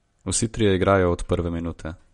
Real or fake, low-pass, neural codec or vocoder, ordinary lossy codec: real; 19.8 kHz; none; MP3, 48 kbps